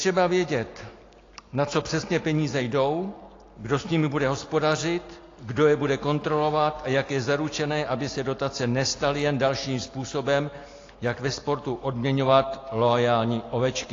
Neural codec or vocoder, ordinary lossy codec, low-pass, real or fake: none; AAC, 32 kbps; 7.2 kHz; real